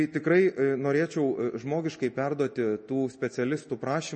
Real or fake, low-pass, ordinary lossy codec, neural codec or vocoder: real; 10.8 kHz; MP3, 32 kbps; none